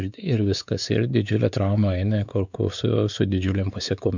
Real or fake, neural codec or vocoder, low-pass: fake; codec, 16 kHz, 4 kbps, X-Codec, WavLM features, trained on Multilingual LibriSpeech; 7.2 kHz